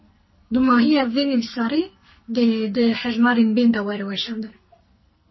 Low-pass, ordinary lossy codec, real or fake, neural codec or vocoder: 7.2 kHz; MP3, 24 kbps; fake; codec, 44.1 kHz, 2.6 kbps, SNAC